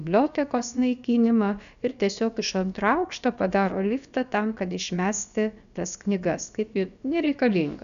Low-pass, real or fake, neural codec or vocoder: 7.2 kHz; fake; codec, 16 kHz, about 1 kbps, DyCAST, with the encoder's durations